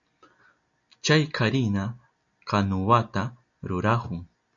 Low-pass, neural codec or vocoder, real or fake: 7.2 kHz; none; real